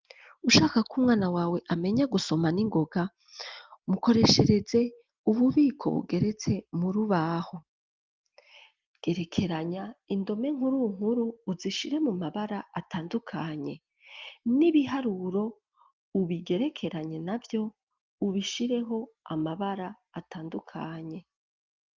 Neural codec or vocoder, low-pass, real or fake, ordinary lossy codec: none; 7.2 kHz; real; Opus, 24 kbps